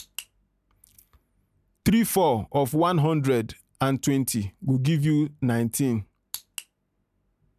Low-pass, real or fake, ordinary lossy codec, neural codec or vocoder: 14.4 kHz; real; none; none